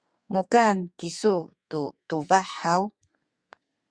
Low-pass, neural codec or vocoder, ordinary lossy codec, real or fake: 9.9 kHz; codec, 32 kHz, 1.9 kbps, SNAC; Opus, 64 kbps; fake